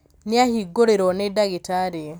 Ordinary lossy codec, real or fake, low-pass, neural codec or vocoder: none; real; none; none